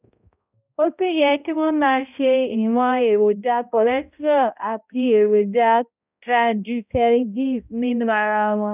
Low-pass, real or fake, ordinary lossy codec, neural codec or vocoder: 3.6 kHz; fake; none; codec, 16 kHz, 0.5 kbps, X-Codec, HuBERT features, trained on balanced general audio